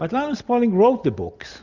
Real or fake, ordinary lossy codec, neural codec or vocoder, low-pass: real; Opus, 64 kbps; none; 7.2 kHz